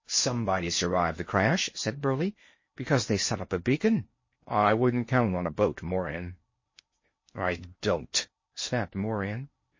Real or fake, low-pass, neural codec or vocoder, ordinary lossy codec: fake; 7.2 kHz; codec, 16 kHz in and 24 kHz out, 0.6 kbps, FocalCodec, streaming, 4096 codes; MP3, 32 kbps